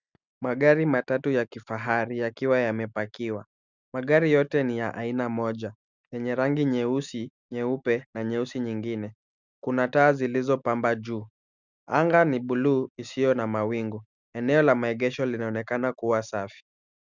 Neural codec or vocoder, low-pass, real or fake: none; 7.2 kHz; real